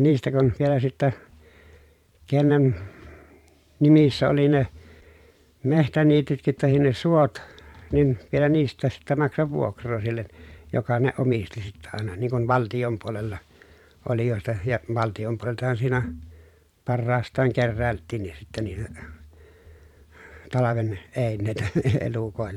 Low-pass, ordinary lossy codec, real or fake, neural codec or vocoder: 19.8 kHz; none; fake; vocoder, 44.1 kHz, 128 mel bands every 512 samples, BigVGAN v2